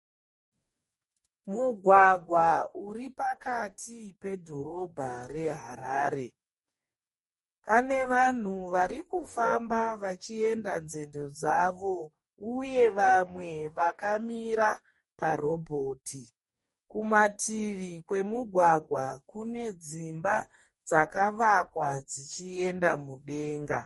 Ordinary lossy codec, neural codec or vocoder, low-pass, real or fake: MP3, 48 kbps; codec, 44.1 kHz, 2.6 kbps, DAC; 19.8 kHz; fake